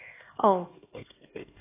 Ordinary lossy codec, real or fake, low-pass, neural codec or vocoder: AAC, 16 kbps; fake; 3.6 kHz; codec, 16 kHz, 2 kbps, X-Codec, HuBERT features, trained on LibriSpeech